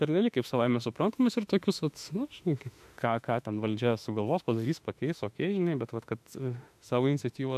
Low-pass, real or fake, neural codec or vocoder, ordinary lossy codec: 14.4 kHz; fake; autoencoder, 48 kHz, 32 numbers a frame, DAC-VAE, trained on Japanese speech; AAC, 96 kbps